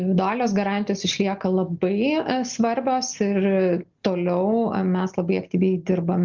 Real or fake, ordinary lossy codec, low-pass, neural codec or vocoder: real; Opus, 32 kbps; 7.2 kHz; none